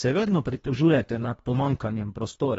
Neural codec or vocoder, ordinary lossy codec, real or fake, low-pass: codec, 24 kHz, 1.5 kbps, HILCodec; AAC, 24 kbps; fake; 10.8 kHz